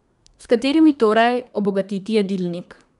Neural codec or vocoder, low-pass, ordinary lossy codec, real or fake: codec, 24 kHz, 1 kbps, SNAC; 10.8 kHz; none; fake